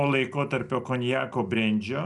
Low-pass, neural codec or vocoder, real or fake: 10.8 kHz; none; real